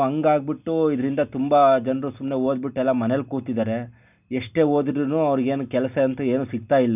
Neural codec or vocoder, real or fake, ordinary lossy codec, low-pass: none; real; none; 3.6 kHz